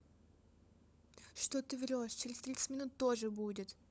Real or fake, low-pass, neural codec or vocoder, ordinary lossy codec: fake; none; codec, 16 kHz, 16 kbps, FunCodec, trained on LibriTTS, 50 frames a second; none